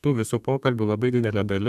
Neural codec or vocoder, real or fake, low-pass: codec, 32 kHz, 1.9 kbps, SNAC; fake; 14.4 kHz